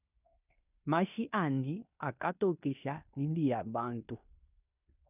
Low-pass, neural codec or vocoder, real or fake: 3.6 kHz; codec, 16 kHz in and 24 kHz out, 0.9 kbps, LongCat-Audio-Codec, fine tuned four codebook decoder; fake